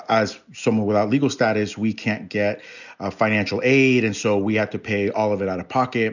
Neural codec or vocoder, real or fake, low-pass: none; real; 7.2 kHz